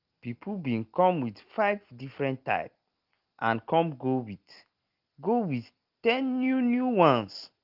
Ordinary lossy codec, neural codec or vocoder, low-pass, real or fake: Opus, 32 kbps; none; 5.4 kHz; real